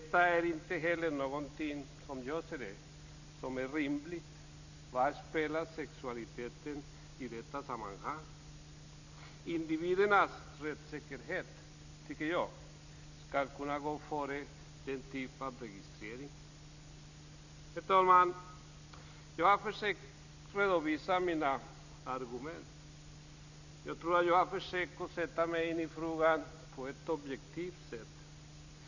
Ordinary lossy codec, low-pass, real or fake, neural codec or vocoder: none; 7.2 kHz; real; none